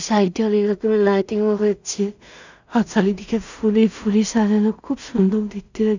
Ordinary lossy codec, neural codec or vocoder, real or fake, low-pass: none; codec, 16 kHz in and 24 kHz out, 0.4 kbps, LongCat-Audio-Codec, two codebook decoder; fake; 7.2 kHz